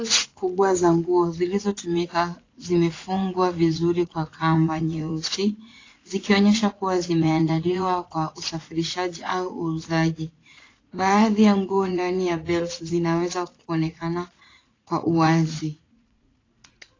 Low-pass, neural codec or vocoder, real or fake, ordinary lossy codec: 7.2 kHz; vocoder, 44.1 kHz, 80 mel bands, Vocos; fake; AAC, 32 kbps